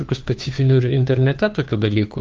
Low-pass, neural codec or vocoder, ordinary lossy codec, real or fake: 7.2 kHz; codec, 16 kHz, 2 kbps, FunCodec, trained on Chinese and English, 25 frames a second; Opus, 32 kbps; fake